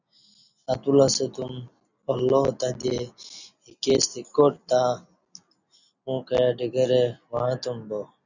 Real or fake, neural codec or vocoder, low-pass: real; none; 7.2 kHz